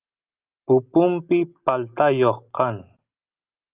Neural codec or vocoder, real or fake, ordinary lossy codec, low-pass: none; real; Opus, 24 kbps; 3.6 kHz